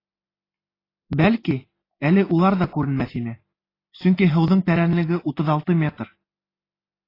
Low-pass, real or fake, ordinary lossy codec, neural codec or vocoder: 5.4 kHz; real; AAC, 24 kbps; none